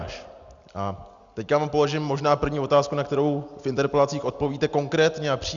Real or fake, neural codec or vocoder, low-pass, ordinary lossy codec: real; none; 7.2 kHz; Opus, 64 kbps